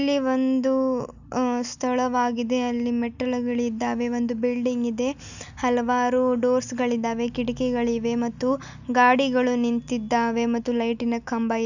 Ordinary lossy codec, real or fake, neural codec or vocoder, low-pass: none; real; none; 7.2 kHz